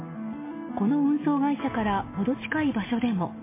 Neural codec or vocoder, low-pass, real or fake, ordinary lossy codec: none; 3.6 kHz; real; MP3, 16 kbps